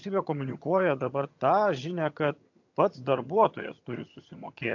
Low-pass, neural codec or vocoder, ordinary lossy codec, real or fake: 7.2 kHz; vocoder, 22.05 kHz, 80 mel bands, HiFi-GAN; AAC, 48 kbps; fake